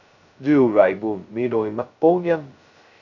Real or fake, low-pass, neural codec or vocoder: fake; 7.2 kHz; codec, 16 kHz, 0.2 kbps, FocalCodec